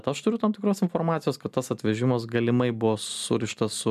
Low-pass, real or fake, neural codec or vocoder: 14.4 kHz; real; none